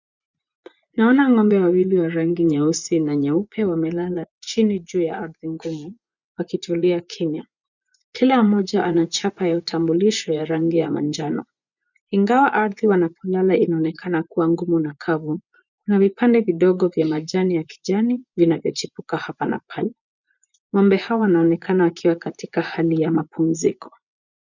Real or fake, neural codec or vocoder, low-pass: fake; vocoder, 24 kHz, 100 mel bands, Vocos; 7.2 kHz